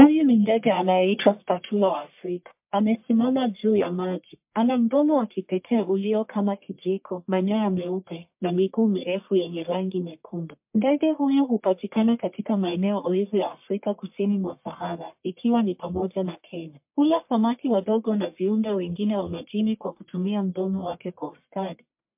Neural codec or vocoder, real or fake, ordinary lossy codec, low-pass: codec, 44.1 kHz, 1.7 kbps, Pupu-Codec; fake; MP3, 32 kbps; 3.6 kHz